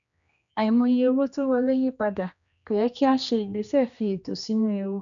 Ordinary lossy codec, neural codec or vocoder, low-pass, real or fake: none; codec, 16 kHz, 2 kbps, X-Codec, HuBERT features, trained on general audio; 7.2 kHz; fake